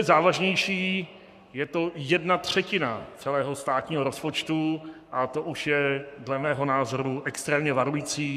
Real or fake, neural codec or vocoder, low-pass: fake; codec, 44.1 kHz, 7.8 kbps, Pupu-Codec; 14.4 kHz